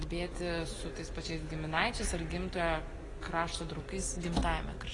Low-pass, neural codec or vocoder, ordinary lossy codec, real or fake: 10.8 kHz; none; AAC, 32 kbps; real